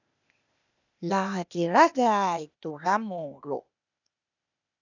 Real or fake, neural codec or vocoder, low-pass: fake; codec, 16 kHz, 0.8 kbps, ZipCodec; 7.2 kHz